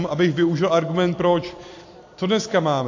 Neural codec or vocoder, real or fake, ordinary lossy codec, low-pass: vocoder, 44.1 kHz, 128 mel bands every 256 samples, BigVGAN v2; fake; AAC, 48 kbps; 7.2 kHz